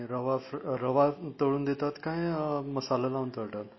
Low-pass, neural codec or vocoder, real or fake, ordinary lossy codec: 7.2 kHz; none; real; MP3, 24 kbps